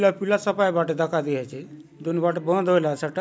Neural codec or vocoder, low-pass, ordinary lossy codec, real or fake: none; none; none; real